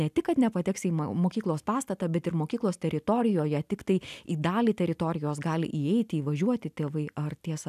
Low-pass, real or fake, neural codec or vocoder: 14.4 kHz; real; none